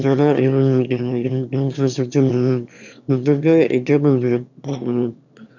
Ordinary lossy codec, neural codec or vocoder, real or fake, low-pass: none; autoencoder, 22.05 kHz, a latent of 192 numbers a frame, VITS, trained on one speaker; fake; 7.2 kHz